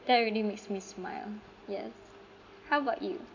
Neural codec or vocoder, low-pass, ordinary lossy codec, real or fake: none; 7.2 kHz; none; real